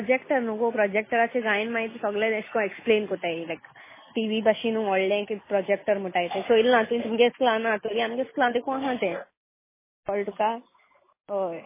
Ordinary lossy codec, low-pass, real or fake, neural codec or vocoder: MP3, 16 kbps; 3.6 kHz; real; none